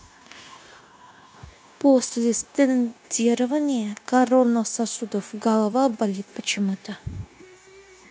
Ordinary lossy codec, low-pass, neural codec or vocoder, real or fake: none; none; codec, 16 kHz, 0.9 kbps, LongCat-Audio-Codec; fake